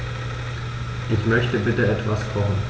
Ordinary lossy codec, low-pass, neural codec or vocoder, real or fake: none; none; none; real